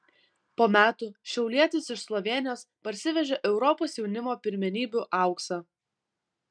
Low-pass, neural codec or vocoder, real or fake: 9.9 kHz; none; real